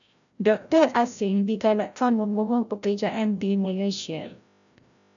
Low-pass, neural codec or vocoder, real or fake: 7.2 kHz; codec, 16 kHz, 0.5 kbps, FreqCodec, larger model; fake